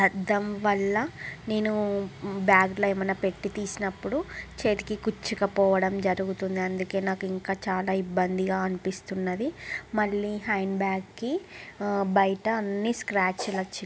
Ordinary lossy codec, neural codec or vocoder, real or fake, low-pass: none; none; real; none